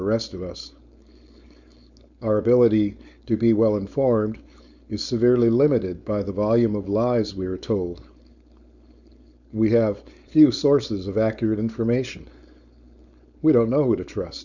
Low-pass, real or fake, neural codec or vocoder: 7.2 kHz; fake; codec, 16 kHz, 4.8 kbps, FACodec